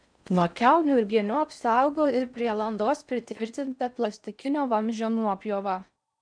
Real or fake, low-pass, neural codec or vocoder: fake; 9.9 kHz; codec, 16 kHz in and 24 kHz out, 0.6 kbps, FocalCodec, streaming, 4096 codes